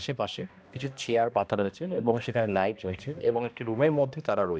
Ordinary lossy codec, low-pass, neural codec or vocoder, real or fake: none; none; codec, 16 kHz, 1 kbps, X-Codec, HuBERT features, trained on balanced general audio; fake